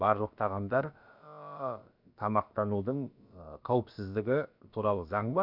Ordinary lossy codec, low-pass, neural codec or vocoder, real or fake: none; 5.4 kHz; codec, 16 kHz, about 1 kbps, DyCAST, with the encoder's durations; fake